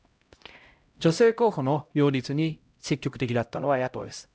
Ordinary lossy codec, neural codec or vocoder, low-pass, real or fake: none; codec, 16 kHz, 0.5 kbps, X-Codec, HuBERT features, trained on LibriSpeech; none; fake